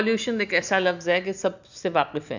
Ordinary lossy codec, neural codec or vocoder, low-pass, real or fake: none; none; 7.2 kHz; real